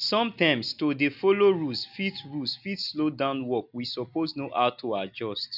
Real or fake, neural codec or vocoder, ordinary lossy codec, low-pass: real; none; none; 5.4 kHz